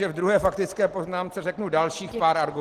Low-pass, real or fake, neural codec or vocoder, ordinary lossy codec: 14.4 kHz; real; none; Opus, 16 kbps